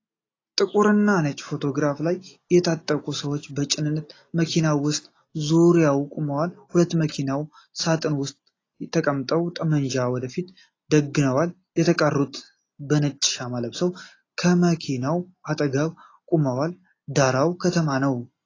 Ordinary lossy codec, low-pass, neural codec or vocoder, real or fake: AAC, 32 kbps; 7.2 kHz; none; real